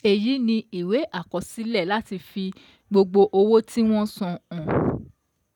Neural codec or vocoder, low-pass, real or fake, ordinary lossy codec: none; 19.8 kHz; real; none